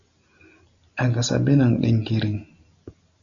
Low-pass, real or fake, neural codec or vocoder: 7.2 kHz; real; none